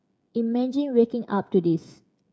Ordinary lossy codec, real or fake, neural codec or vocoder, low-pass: none; fake; codec, 16 kHz, 16 kbps, FreqCodec, smaller model; none